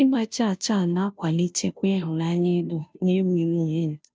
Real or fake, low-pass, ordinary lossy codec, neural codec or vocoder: fake; none; none; codec, 16 kHz, 0.5 kbps, FunCodec, trained on Chinese and English, 25 frames a second